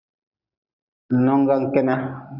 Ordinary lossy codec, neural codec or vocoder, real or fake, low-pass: Opus, 64 kbps; none; real; 5.4 kHz